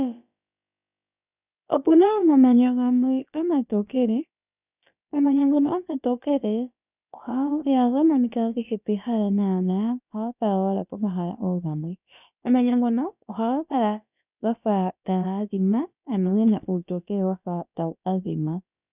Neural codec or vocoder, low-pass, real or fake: codec, 16 kHz, about 1 kbps, DyCAST, with the encoder's durations; 3.6 kHz; fake